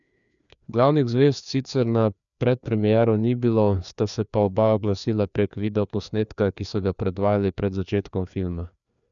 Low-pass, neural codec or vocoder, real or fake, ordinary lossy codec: 7.2 kHz; codec, 16 kHz, 2 kbps, FreqCodec, larger model; fake; none